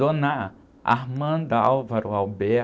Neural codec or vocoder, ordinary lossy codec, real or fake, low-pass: none; none; real; none